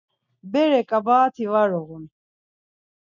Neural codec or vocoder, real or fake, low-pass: none; real; 7.2 kHz